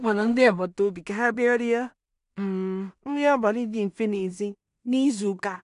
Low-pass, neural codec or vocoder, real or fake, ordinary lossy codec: 10.8 kHz; codec, 16 kHz in and 24 kHz out, 0.4 kbps, LongCat-Audio-Codec, two codebook decoder; fake; none